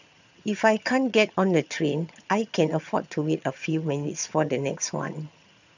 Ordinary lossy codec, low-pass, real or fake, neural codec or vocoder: none; 7.2 kHz; fake; vocoder, 22.05 kHz, 80 mel bands, HiFi-GAN